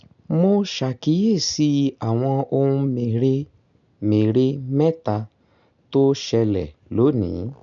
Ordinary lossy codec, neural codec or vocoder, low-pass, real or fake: none; none; 7.2 kHz; real